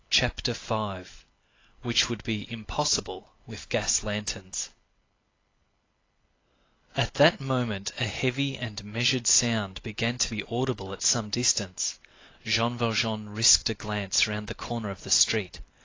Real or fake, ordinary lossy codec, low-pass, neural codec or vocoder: real; AAC, 32 kbps; 7.2 kHz; none